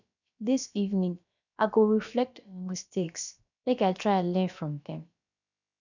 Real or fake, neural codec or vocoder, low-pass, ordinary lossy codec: fake; codec, 16 kHz, about 1 kbps, DyCAST, with the encoder's durations; 7.2 kHz; none